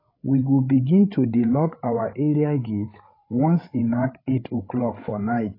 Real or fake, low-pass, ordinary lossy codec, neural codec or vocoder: fake; 5.4 kHz; AAC, 24 kbps; codec, 16 kHz, 8 kbps, FreqCodec, larger model